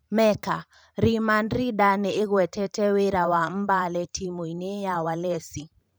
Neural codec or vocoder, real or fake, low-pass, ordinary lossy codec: vocoder, 44.1 kHz, 128 mel bands every 512 samples, BigVGAN v2; fake; none; none